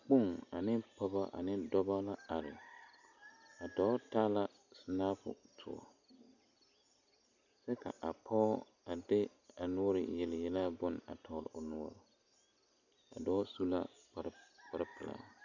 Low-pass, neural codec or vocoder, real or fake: 7.2 kHz; none; real